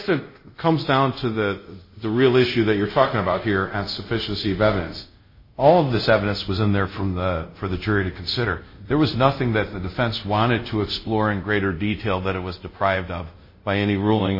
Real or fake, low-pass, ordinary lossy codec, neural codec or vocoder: fake; 5.4 kHz; MP3, 24 kbps; codec, 24 kHz, 0.5 kbps, DualCodec